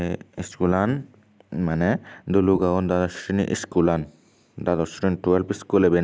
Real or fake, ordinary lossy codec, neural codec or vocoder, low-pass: real; none; none; none